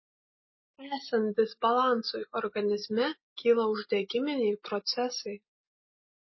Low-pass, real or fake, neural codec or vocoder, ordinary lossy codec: 7.2 kHz; real; none; MP3, 24 kbps